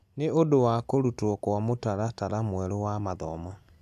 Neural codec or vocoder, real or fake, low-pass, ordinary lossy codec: none; real; 14.4 kHz; AAC, 96 kbps